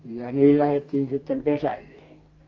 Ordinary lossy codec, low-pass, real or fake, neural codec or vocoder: Opus, 32 kbps; 7.2 kHz; fake; codec, 44.1 kHz, 2.6 kbps, DAC